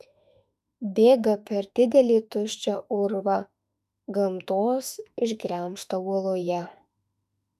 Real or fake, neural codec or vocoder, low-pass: fake; autoencoder, 48 kHz, 32 numbers a frame, DAC-VAE, trained on Japanese speech; 14.4 kHz